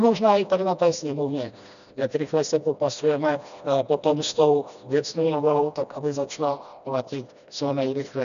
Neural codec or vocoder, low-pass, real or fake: codec, 16 kHz, 1 kbps, FreqCodec, smaller model; 7.2 kHz; fake